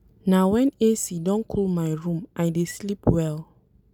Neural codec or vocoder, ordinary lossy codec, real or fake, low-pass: none; none; real; 19.8 kHz